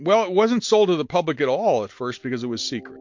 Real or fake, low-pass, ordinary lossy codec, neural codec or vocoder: real; 7.2 kHz; MP3, 48 kbps; none